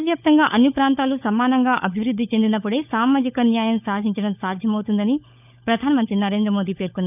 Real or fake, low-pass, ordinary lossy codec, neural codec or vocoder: fake; 3.6 kHz; none; codec, 16 kHz, 16 kbps, FunCodec, trained on LibriTTS, 50 frames a second